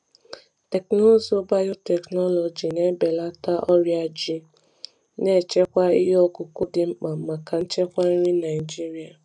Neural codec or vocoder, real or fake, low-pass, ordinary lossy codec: none; real; 10.8 kHz; none